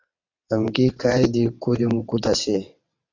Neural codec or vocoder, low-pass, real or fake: vocoder, 22.05 kHz, 80 mel bands, WaveNeXt; 7.2 kHz; fake